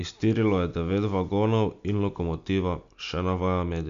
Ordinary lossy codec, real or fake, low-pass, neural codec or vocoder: MP3, 64 kbps; real; 7.2 kHz; none